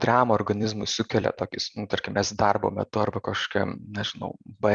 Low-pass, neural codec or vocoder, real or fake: 9.9 kHz; vocoder, 44.1 kHz, 128 mel bands every 256 samples, BigVGAN v2; fake